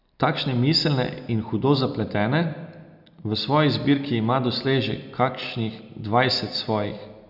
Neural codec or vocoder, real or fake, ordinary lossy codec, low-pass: none; real; none; 5.4 kHz